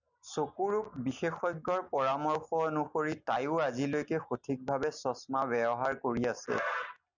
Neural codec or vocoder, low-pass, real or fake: none; 7.2 kHz; real